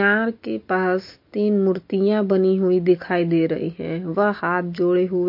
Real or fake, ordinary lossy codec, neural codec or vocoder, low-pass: real; MP3, 32 kbps; none; 5.4 kHz